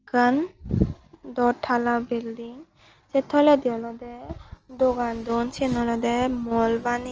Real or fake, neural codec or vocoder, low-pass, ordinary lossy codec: real; none; 7.2 kHz; Opus, 24 kbps